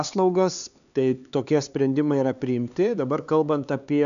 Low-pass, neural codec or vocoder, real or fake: 7.2 kHz; codec, 16 kHz, 4 kbps, X-Codec, HuBERT features, trained on LibriSpeech; fake